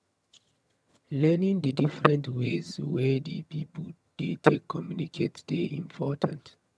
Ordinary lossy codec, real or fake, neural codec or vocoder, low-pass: none; fake; vocoder, 22.05 kHz, 80 mel bands, HiFi-GAN; none